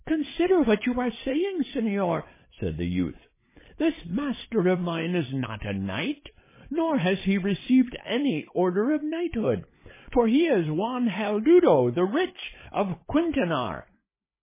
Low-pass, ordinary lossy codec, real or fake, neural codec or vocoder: 3.6 kHz; MP3, 16 kbps; fake; codec, 16 kHz, 8 kbps, FreqCodec, larger model